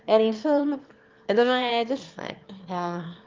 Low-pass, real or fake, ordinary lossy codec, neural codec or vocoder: 7.2 kHz; fake; Opus, 32 kbps; autoencoder, 22.05 kHz, a latent of 192 numbers a frame, VITS, trained on one speaker